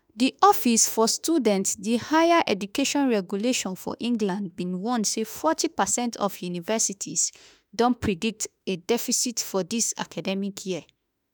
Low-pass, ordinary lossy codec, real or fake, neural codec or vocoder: none; none; fake; autoencoder, 48 kHz, 32 numbers a frame, DAC-VAE, trained on Japanese speech